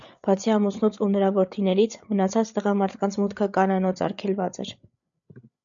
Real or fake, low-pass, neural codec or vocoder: fake; 7.2 kHz; codec, 16 kHz, 8 kbps, FreqCodec, larger model